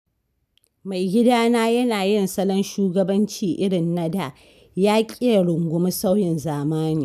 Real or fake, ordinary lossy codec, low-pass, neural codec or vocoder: real; none; 14.4 kHz; none